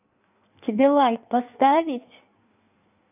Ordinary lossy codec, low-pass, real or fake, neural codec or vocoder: none; 3.6 kHz; fake; codec, 16 kHz in and 24 kHz out, 1.1 kbps, FireRedTTS-2 codec